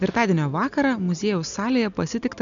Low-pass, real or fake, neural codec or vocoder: 7.2 kHz; real; none